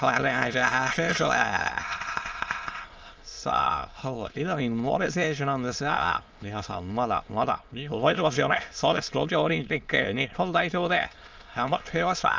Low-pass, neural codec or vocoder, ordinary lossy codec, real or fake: 7.2 kHz; autoencoder, 22.05 kHz, a latent of 192 numbers a frame, VITS, trained on many speakers; Opus, 24 kbps; fake